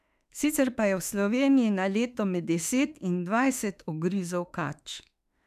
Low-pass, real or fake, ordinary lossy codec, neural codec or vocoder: 14.4 kHz; fake; none; autoencoder, 48 kHz, 32 numbers a frame, DAC-VAE, trained on Japanese speech